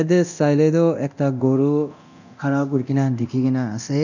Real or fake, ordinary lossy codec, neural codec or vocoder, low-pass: fake; none; codec, 24 kHz, 0.9 kbps, DualCodec; 7.2 kHz